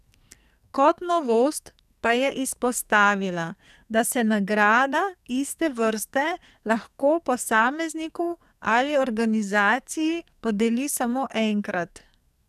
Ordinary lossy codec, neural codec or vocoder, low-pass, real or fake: none; codec, 44.1 kHz, 2.6 kbps, SNAC; 14.4 kHz; fake